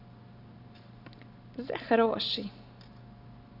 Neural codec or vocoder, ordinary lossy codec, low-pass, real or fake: none; none; 5.4 kHz; real